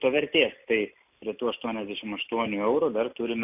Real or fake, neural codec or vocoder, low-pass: real; none; 3.6 kHz